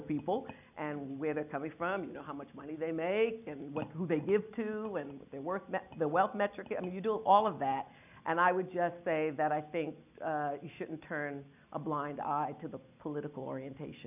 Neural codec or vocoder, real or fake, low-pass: none; real; 3.6 kHz